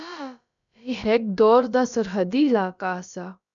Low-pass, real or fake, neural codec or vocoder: 7.2 kHz; fake; codec, 16 kHz, about 1 kbps, DyCAST, with the encoder's durations